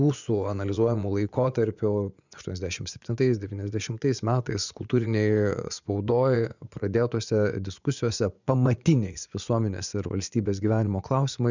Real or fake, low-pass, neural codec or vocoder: fake; 7.2 kHz; vocoder, 24 kHz, 100 mel bands, Vocos